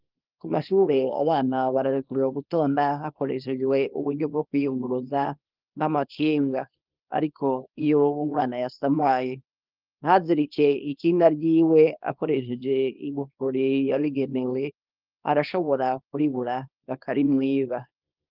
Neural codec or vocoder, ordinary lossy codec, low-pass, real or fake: codec, 24 kHz, 0.9 kbps, WavTokenizer, small release; Opus, 24 kbps; 5.4 kHz; fake